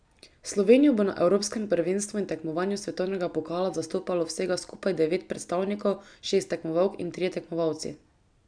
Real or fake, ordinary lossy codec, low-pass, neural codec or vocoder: real; none; 9.9 kHz; none